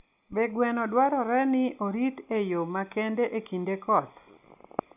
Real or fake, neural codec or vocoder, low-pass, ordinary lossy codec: real; none; 3.6 kHz; none